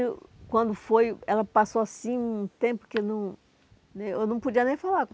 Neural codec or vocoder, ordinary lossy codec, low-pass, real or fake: none; none; none; real